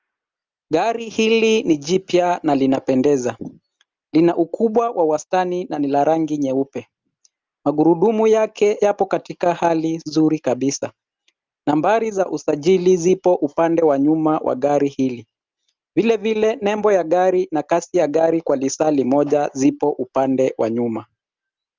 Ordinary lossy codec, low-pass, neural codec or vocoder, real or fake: Opus, 32 kbps; 7.2 kHz; none; real